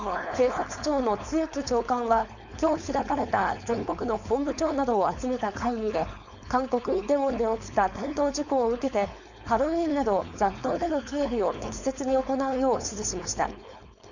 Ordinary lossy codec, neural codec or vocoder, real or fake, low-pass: none; codec, 16 kHz, 4.8 kbps, FACodec; fake; 7.2 kHz